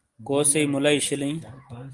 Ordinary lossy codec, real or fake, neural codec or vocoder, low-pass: Opus, 24 kbps; real; none; 10.8 kHz